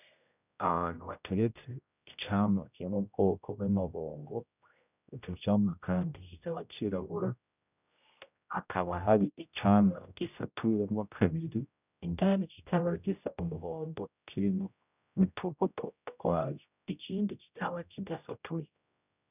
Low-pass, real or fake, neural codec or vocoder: 3.6 kHz; fake; codec, 16 kHz, 0.5 kbps, X-Codec, HuBERT features, trained on general audio